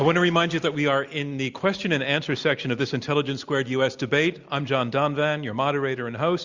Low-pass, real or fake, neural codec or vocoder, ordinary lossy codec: 7.2 kHz; real; none; Opus, 64 kbps